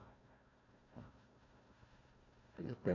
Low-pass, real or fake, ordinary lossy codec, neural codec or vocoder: 7.2 kHz; fake; none; codec, 16 kHz, 1 kbps, FunCodec, trained on Chinese and English, 50 frames a second